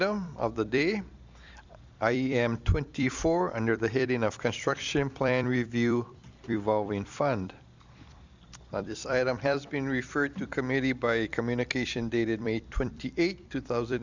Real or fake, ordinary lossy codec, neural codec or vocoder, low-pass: fake; Opus, 64 kbps; vocoder, 44.1 kHz, 128 mel bands every 256 samples, BigVGAN v2; 7.2 kHz